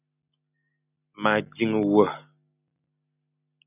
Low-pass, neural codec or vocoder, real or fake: 3.6 kHz; none; real